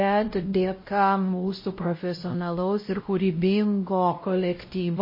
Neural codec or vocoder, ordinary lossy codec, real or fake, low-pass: codec, 16 kHz, 0.5 kbps, X-Codec, WavLM features, trained on Multilingual LibriSpeech; MP3, 24 kbps; fake; 5.4 kHz